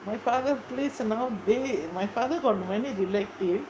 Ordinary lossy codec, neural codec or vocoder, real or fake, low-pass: none; codec, 16 kHz, 6 kbps, DAC; fake; none